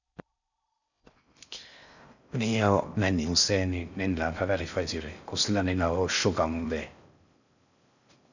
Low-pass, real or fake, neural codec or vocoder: 7.2 kHz; fake; codec, 16 kHz in and 24 kHz out, 0.6 kbps, FocalCodec, streaming, 4096 codes